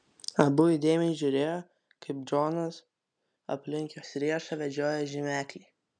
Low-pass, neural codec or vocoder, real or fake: 9.9 kHz; none; real